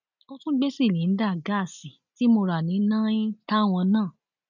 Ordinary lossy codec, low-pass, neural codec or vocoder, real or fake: none; 7.2 kHz; none; real